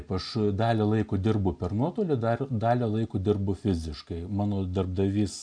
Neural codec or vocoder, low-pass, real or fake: none; 9.9 kHz; real